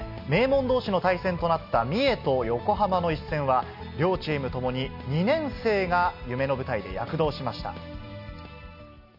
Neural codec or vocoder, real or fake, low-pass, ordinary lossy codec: none; real; 5.4 kHz; none